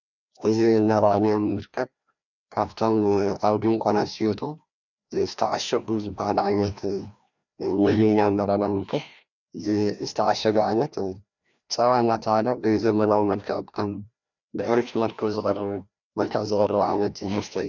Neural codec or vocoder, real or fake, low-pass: codec, 16 kHz, 1 kbps, FreqCodec, larger model; fake; 7.2 kHz